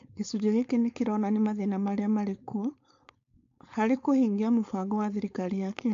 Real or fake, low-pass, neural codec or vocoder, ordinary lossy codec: fake; 7.2 kHz; codec, 16 kHz, 4.8 kbps, FACodec; AAC, 48 kbps